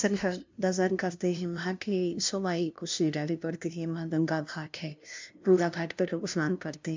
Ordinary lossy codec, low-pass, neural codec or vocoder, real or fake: MP3, 64 kbps; 7.2 kHz; codec, 16 kHz, 0.5 kbps, FunCodec, trained on LibriTTS, 25 frames a second; fake